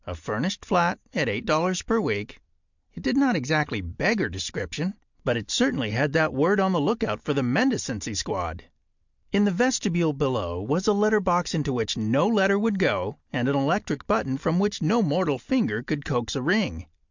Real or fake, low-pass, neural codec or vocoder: real; 7.2 kHz; none